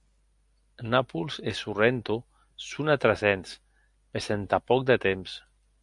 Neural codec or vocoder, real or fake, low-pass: none; real; 10.8 kHz